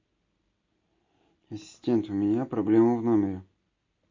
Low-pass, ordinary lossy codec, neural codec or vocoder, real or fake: 7.2 kHz; MP3, 48 kbps; none; real